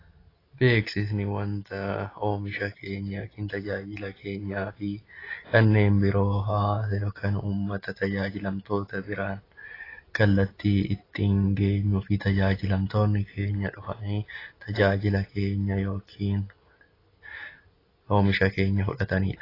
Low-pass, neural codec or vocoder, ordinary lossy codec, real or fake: 5.4 kHz; none; AAC, 24 kbps; real